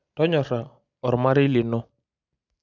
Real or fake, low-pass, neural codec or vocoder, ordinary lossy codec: real; 7.2 kHz; none; none